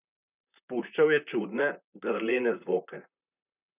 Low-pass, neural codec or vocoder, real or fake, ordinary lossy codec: 3.6 kHz; vocoder, 44.1 kHz, 128 mel bands, Pupu-Vocoder; fake; none